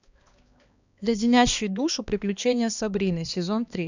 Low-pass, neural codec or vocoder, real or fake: 7.2 kHz; codec, 16 kHz, 2 kbps, X-Codec, HuBERT features, trained on balanced general audio; fake